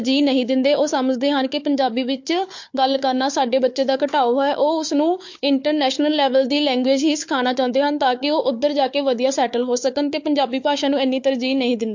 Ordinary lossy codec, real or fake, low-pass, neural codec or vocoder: MP3, 48 kbps; fake; 7.2 kHz; codec, 16 kHz, 4 kbps, FunCodec, trained on Chinese and English, 50 frames a second